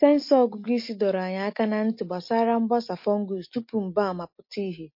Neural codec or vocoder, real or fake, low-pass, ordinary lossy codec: none; real; 5.4 kHz; MP3, 32 kbps